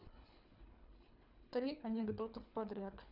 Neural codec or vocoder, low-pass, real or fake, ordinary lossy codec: codec, 24 kHz, 3 kbps, HILCodec; 5.4 kHz; fake; none